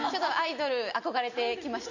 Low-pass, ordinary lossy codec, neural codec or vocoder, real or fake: 7.2 kHz; none; none; real